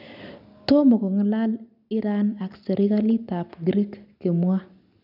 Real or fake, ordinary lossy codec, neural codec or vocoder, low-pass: real; none; none; 5.4 kHz